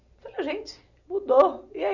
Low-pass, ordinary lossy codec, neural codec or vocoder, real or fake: 7.2 kHz; none; none; real